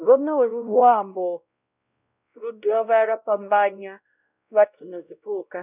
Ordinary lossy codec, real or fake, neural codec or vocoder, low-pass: none; fake; codec, 16 kHz, 0.5 kbps, X-Codec, WavLM features, trained on Multilingual LibriSpeech; 3.6 kHz